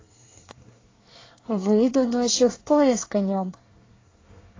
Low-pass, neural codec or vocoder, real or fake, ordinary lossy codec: 7.2 kHz; codec, 24 kHz, 1 kbps, SNAC; fake; AAC, 32 kbps